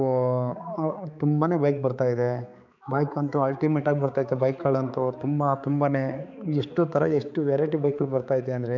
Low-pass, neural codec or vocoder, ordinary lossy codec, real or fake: 7.2 kHz; codec, 16 kHz, 4 kbps, X-Codec, HuBERT features, trained on balanced general audio; none; fake